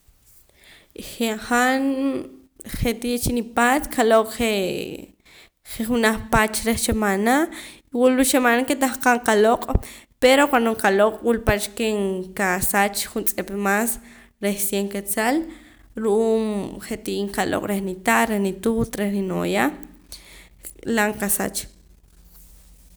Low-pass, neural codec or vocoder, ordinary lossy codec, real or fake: none; none; none; real